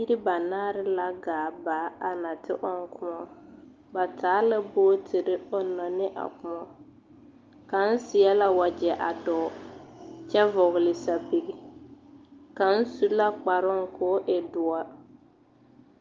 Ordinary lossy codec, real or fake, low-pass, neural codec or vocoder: Opus, 24 kbps; real; 7.2 kHz; none